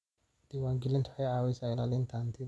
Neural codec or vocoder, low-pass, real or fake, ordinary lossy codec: none; none; real; none